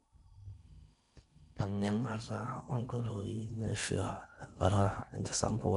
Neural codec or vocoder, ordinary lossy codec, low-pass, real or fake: codec, 16 kHz in and 24 kHz out, 0.6 kbps, FocalCodec, streaming, 4096 codes; none; 10.8 kHz; fake